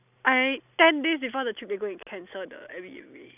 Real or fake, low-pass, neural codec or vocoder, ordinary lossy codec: real; 3.6 kHz; none; none